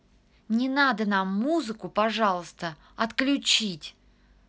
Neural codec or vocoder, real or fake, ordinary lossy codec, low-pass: none; real; none; none